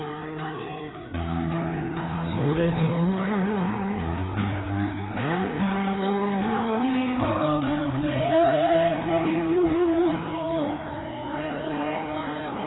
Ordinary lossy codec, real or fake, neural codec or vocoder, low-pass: AAC, 16 kbps; fake; codec, 16 kHz, 2 kbps, FreqCodec, larger model; 7.2 kHz